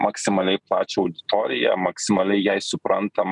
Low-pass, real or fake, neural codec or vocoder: 10.8 kHz; real; none